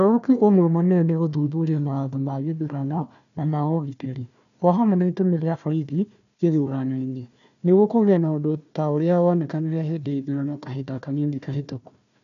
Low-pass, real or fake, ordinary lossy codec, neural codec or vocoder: 7.2 kHz; fake; none; codec, 16 kHz, 1 kbps, FunCodec, trained on Chinese and English, 50 frames a second